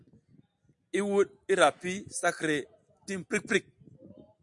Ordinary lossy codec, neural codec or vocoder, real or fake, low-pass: MP3, 64 kbps; none; real; 10.8 kHz